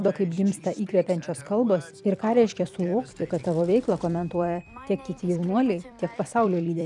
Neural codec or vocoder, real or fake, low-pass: vocoder, 44.1 kHz, 128 mel bands every 256 samples, BigVGAN v2; fake; 10.8 kHz